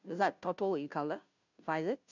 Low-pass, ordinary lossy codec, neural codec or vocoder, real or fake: 7.2 kHz; none; codec, 16 kHz, 0.5 kbps, FunCodec, trained on Chinese and English, 25 frames a second; fake